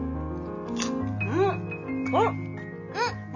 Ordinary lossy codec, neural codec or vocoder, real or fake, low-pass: none; none; real; 7.2 kHz